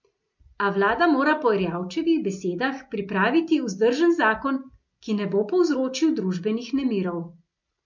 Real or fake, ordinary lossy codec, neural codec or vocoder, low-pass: real; MP3, 48 kbps; none; 7.2 kHz